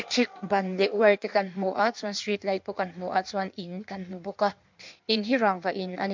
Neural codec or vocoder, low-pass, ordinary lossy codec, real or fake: codec, 16 kHz in and 24 kHz out, 1.1 kbps, FireRedTTS-2 codec; 7.2 kHz; MP3, 64 kbps; fake